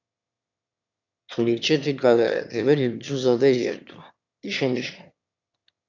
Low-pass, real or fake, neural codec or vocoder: 7.2 kHz; fake; autoencoder, 22.05 kHz, a latent of 192 numbers a frame, VITS, trained on one speaker